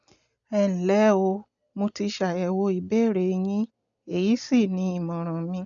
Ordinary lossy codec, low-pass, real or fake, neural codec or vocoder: none; 7.2 kHz; real; none